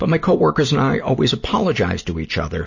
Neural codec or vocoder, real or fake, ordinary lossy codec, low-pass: none; real; MP3, 32 kbps; 7.2 kHz